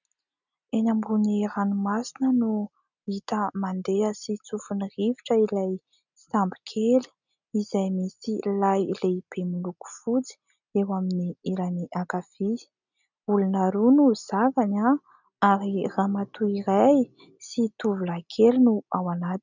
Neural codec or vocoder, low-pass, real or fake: none; 7.2 kHz; real